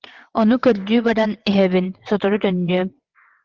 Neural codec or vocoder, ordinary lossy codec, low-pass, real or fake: vocoder, 22.05 kHz, 80 mel bands, WaveNeXt; Opus, 16 kbps; 7.2 kHz; fake